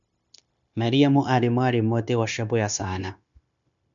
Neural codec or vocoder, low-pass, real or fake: codec, 16 kHz, 0.9 kbps, LongCat-Audio-Codec; 7.2 kHz; fake